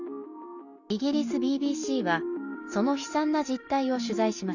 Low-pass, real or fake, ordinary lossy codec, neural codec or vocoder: 7.2 kHz; real; none; none